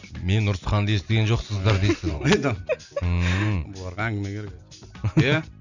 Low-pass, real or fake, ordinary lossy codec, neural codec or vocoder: 7.2 kHz; real; none; none